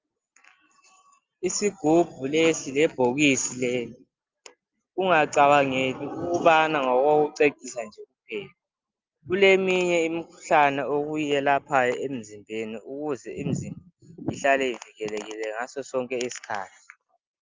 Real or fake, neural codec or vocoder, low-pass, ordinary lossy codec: real; none; 7.2 kHz; Opus, 32 kbps